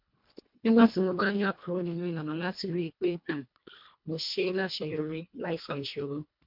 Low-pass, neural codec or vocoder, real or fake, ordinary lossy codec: 5.4 kHz; codec, 24 kHz, 1.5 kbps, HILCodec; fake; MP3, 48 kbps